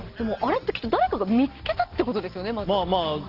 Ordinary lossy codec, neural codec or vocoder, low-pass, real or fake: Opus, 16 kbps; none; 5.4 kHz; real